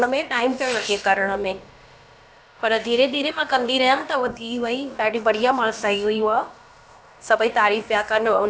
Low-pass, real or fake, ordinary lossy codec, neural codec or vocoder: none; fake; none; codec, 16 kHz, about 1 kbps, DyCAST, with the encoder's durations